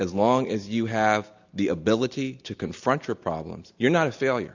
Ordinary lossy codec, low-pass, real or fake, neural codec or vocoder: Opus, 64 kbps; 7.2 kHz; real; none